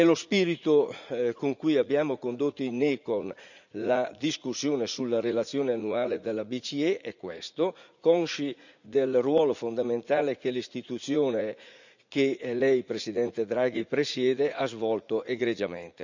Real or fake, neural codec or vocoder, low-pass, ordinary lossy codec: fake; vocoder, 44.1 kHz, 80 mel bands, Vocos; 7.2 kHz; none